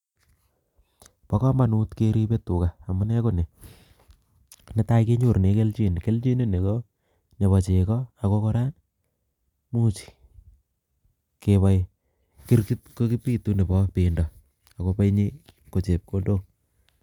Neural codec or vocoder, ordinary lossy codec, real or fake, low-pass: none; none; real; 19.8 kHz